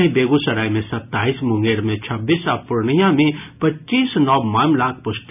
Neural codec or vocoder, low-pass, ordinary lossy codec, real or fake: none; 3.6 kHz; none; real